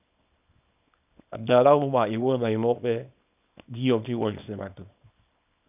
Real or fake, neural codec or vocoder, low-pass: fake; codec, 24 kHz, 0.9 kbps, WavTokenizer, small release; 3.6 kHz